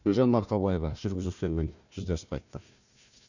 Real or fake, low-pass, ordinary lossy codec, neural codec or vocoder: fake; 7.2 kHz; none; codec, 16 kHz, 1 kbps, FunCodec, trained on Chinese and English, 50 frames a second